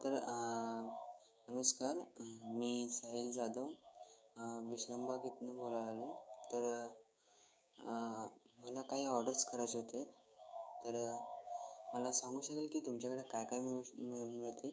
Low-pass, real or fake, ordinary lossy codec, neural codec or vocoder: none; fake; none; codec, 16 kHz, 6 kbps, DAC